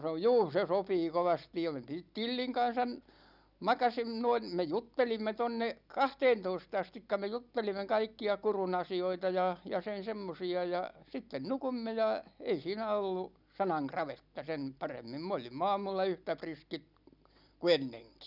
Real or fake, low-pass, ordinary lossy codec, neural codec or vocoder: real; 5.4 kHz; none; none